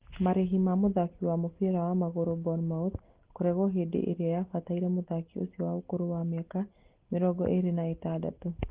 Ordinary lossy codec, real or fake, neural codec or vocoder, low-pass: Opus, 16 kbps; real; none; 3.6 kHz